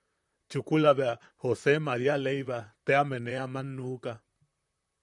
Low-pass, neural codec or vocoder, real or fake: 10.8 kHz; vocoder, 44.1 kHz, 128 mel bands, Pupu-Vocoder; fake